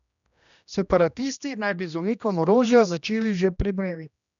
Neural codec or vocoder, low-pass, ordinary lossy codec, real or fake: codec, 16 kHz, 1 kbps, X-Codec, HuBERT features, trained on general audio; 7.2 kHz; none; fake